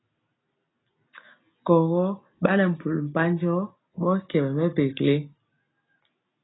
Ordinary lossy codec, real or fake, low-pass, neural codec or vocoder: AAC, 16 kbps; real; 7.2 kHz; none